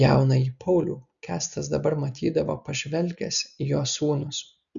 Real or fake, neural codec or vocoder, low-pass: real; none; 7.2 kHz